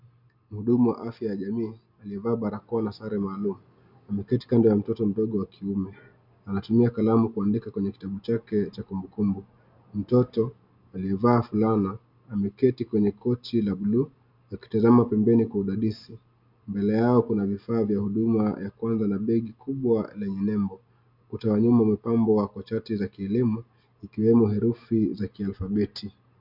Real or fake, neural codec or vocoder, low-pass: real; none; 5.4 kHz